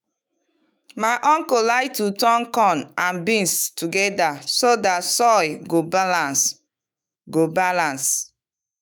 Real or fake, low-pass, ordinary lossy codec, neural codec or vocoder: fake; none; none; autoencoder, 48 kHz, 128 numbers a frame, DAC-VAE, trained on Japanese speech